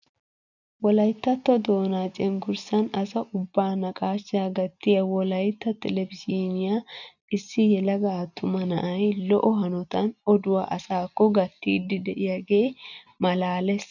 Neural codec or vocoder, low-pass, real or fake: none; 7.2 kHz; real